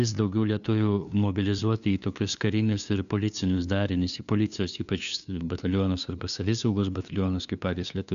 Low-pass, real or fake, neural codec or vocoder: 7.2 kHz; fake; codec, 16 kHz, 2 kbps, FunCodec, trained on LibriTTS, 25 frames a second